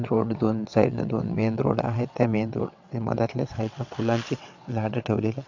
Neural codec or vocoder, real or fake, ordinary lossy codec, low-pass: vocoder, 22.05 kHz, 80 mel bands, WaveNeXt; fake; none; 7.2 kHz